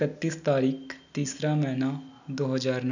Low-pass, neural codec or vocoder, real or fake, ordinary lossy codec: 7.2 kHz; none; real; none